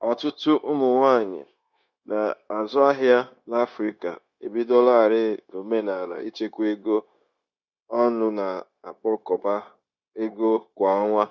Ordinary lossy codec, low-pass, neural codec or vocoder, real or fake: Opus, 64 kbps; 7.2 kHz; codec, 16 kHz, 0.9 kbps, LongCat-Audio-Codec; fake